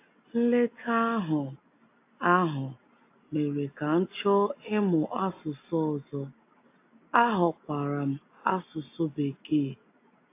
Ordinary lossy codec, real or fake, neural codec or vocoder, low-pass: AAC, 24 kbps; real; none; 3.6 kHz